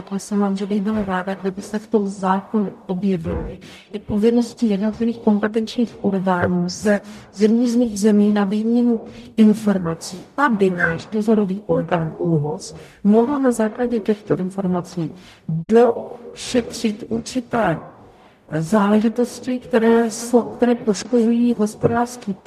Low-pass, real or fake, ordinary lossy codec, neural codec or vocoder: 14.4 kHz; fake; MP3, 96 kbps; codec, 44.1 kHz, 0.9 kbps, DAC